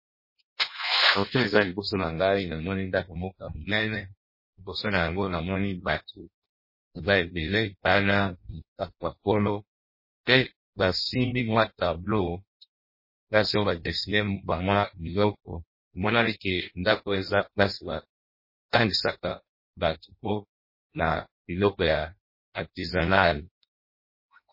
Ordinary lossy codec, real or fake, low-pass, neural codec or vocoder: MP3, 24 kbps; fake; 5.4 kHz; codec, 16 kHz in and 24 kHz out, 0.6 kbps, FireRedTTS-2 codec